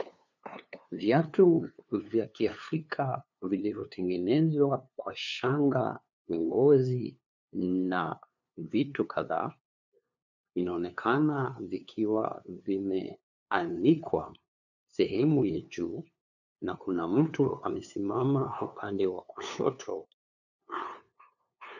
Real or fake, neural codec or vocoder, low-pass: fake; codec, 16 kHz, 2 kbps, FunCodec, trained on LibriTTS, 25 frames a second; 7.2 kHz